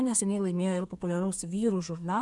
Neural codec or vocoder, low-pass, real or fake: codec, 32 kHz, 1.9 kbps, SNAC; 10.8 kHz; fake